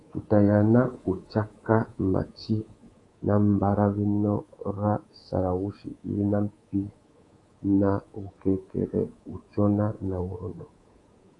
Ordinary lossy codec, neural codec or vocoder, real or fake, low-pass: AAC, 32 kbps; codec, 24 kHz, 3.1 kbps, DualCodec; fake; 10.8 kHz